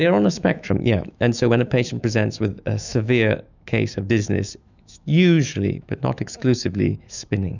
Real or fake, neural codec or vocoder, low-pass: fake; autoencoder, 48 kHz, 128 numbers a frame, DAC-VAE, trained on Japanese speech; 7.2 kHz